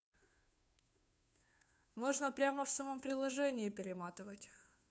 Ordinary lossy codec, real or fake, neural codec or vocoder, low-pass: none; fake; codec, 16 kHz, 2 kbps, FunCodec, trained on Chinese and English, 25 frames a second; none